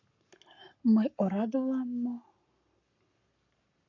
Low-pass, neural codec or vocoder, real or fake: 7.2 kHz; codec, 44.1 kHz, 7.8 kbps, Pupu-Codec; fake